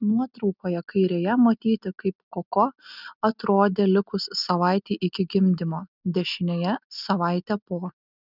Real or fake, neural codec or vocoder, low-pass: real; none; 5.4 kHz